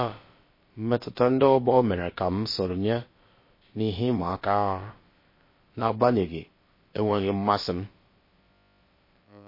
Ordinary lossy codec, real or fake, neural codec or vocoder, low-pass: MP3, 24 kbps; fake; codec, 16 kHz, about 1 kbps, DyCAST, with the encoder's durations; 5.4 kHz